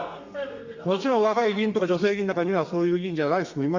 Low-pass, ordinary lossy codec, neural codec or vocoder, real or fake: 7.2 kHz; Opus, 64 kbps; codec, 32 kHz, 1.9 kbps, SNAC; fake